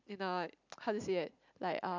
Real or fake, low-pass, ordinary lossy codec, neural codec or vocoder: real; 7.2 kHz; none; none